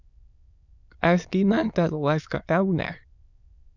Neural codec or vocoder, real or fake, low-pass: autoencoder, 22.05 kHz, a latent of 192 numbers a frame, VITS, trained on many speakers; fake; 7.2 kHz